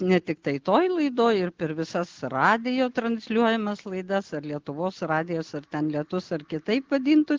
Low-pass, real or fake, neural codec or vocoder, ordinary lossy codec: 7.2 kHz; real; none; Opus, 16 kbps